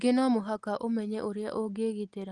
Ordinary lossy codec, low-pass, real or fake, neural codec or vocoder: Opus, 24 kbps; 10.8 kHz; real; none